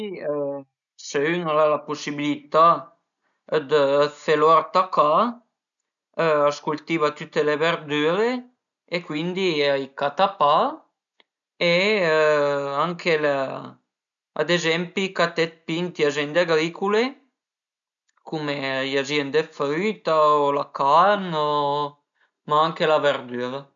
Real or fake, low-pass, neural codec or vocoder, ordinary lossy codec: real; 7.2 kHz; none; none